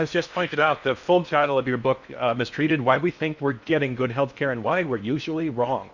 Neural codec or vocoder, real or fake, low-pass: codec, 16 kHz in and 24 kHz out, 0.8 kbps, FocalCodec, streaming, 65536 codes; fake; 7.2 kHz